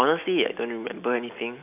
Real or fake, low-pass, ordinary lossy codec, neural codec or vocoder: real; 3.6 kHz; none; none